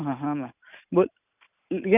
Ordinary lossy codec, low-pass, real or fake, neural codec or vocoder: none; 3.6 kHz; real; none